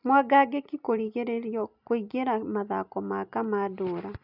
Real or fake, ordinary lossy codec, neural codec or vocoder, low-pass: real; none; none; 5.4 kHz